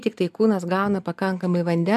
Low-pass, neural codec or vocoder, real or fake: 14.4 kHz; none; real